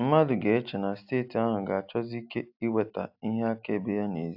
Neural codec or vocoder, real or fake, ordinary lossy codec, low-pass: none; real; AAC, 48 kbps; 5.4 kHz